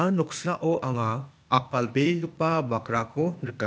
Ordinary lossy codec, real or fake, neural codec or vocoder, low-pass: none; fake; codec, 16 kHz, 0.8 kbps, ZipCodec; none